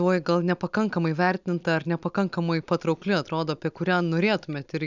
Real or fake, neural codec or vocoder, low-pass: real; none; 7.2 kHz